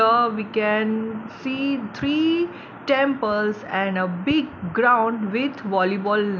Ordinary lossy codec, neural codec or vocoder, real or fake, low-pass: none; none; real; none